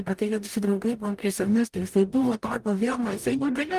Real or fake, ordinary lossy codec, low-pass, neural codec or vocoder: fake; Opus, 32 kbps; 14.4 kHz; codec, 44.1 kHz, 0.9 kbps, DAC